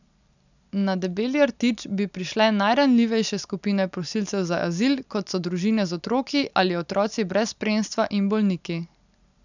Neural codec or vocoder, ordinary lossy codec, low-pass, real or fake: none; none; 7.2 kHz; real